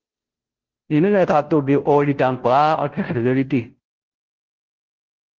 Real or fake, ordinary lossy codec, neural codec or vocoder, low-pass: fake; Opus, 16 kbps; codec, 16 kHz, 0.5 kbps, FunCodec, trained on Chinese and English, 25 frames a second; 7.2 kHz